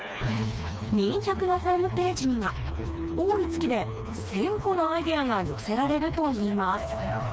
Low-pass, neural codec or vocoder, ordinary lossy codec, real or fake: none; codec, 16 kHz, 2 kbps, FreqCodec, smaller model; none; fake